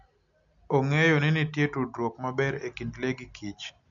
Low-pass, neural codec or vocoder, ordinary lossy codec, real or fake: 7.2 kHz; none; none; real